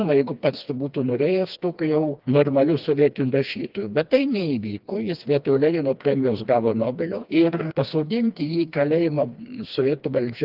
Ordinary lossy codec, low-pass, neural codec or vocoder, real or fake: Opus, 32 kbps; 5.4 kHz; codec, 16 kHz, 2 kbps, FreqCodec, smaller model; fake